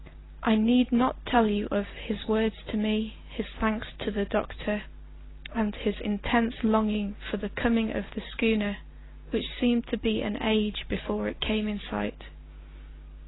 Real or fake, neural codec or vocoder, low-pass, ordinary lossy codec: real; none; 7.2 kHz; AAC, 16 kbps